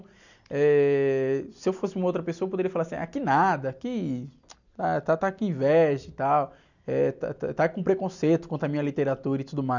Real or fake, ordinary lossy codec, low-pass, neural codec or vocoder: real; none; 7.2 kHz; none